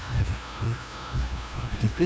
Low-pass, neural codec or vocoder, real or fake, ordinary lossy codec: none; codec, 16 kHz, 0.5 kbps, FunCodec, trained on LibriTTS, 25 frames a second; fake; none